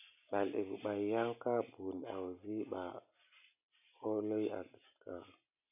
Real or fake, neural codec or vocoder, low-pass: real; none; 3.6 kHz